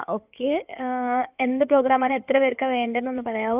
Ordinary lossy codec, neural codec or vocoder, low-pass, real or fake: none; codec, 16 kHz in and 24 kHz out, 2.2 kbps, FireRedTTS-2 codec; 3.6 kHz; fake